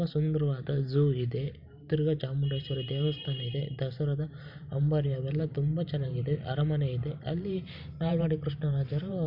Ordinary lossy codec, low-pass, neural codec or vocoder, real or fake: none; 5.4 kHz; vocoder, 44.1 kHz, 128 mel bands every 512 samples, BigVGAN v2; fake